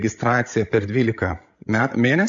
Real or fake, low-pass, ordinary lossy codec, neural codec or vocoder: fake; 7.2 kHz; AAC, 64 kbps; codec, 16 kHz, 16 kbps, FreqCodec, larger model